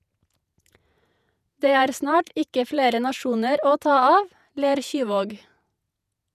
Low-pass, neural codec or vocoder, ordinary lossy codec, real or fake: 14.4 kHz; vocoder, 48 kHz, 128 mel bands, Vocos; none; fake